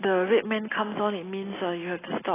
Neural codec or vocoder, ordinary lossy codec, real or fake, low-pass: none; AAC, 16 kbps; real; 3.6 kHz